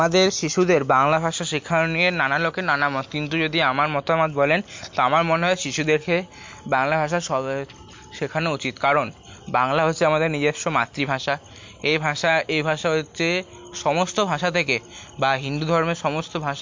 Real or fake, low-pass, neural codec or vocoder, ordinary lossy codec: real; 7.2 kHz; none; MP3, 48 kbps